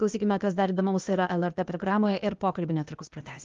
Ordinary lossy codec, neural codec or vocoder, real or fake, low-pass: Opus, 32 kbps; codec, 16 kHz, 0.8 kbps, ZipCodec; fake; 7.2 kHz